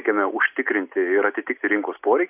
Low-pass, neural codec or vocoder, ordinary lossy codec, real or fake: 3.6 kHz; none; AAC, 32 kbps; real